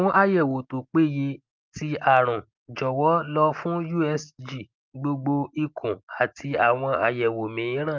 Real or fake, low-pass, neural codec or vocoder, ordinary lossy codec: real; 7.2 kHz; none; Opus, 24 kbps